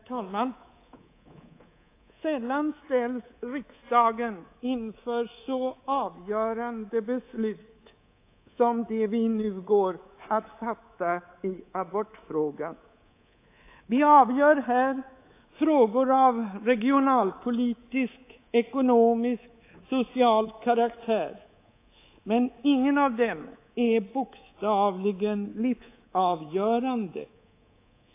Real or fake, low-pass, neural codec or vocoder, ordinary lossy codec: fake; 3.6 kHz; codec, 24 kHz, 3.1 kbps, DualCodec; AAC, 24 kbps